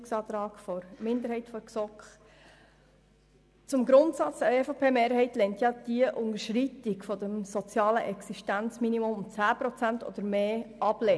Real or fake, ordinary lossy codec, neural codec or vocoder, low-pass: real; none; none; none